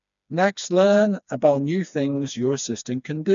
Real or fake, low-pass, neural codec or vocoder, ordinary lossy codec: fake; 7.2 kHz; codec, 16 kHz, 2 kbps, FreqCodec, smaller model; none